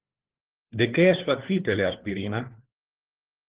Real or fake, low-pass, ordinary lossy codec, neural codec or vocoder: fake; 3.6 kHz; Opus, 16 kbps; codec, 16 kHz, 4 kbps, FunCodec, trained on LibriTTS, 50 frames a second